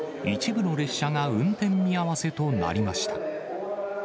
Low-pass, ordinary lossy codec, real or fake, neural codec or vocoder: none; none; real; none